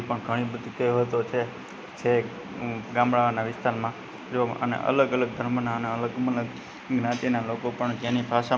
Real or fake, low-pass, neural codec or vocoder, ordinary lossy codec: real; none; none; none